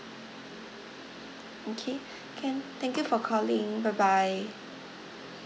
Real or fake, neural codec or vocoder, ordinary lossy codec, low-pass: real; none; none; none